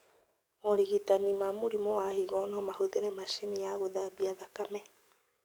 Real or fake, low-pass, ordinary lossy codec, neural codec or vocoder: fake; none; none; codec, 44.1 kHz, 7.8 kbps, DAC